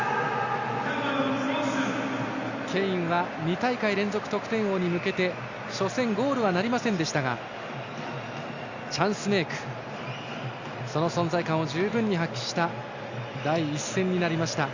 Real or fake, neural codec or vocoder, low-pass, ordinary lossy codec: real; none; 7.2 kHz; Opus, 64 kbps